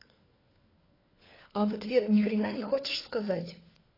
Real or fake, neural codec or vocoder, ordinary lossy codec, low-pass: fake; codec, 16 kHz, 4 kbps, FunCodec, trained on LibriTTS, 50 frames a second; AAC, 24 kbps; 5.4 kHz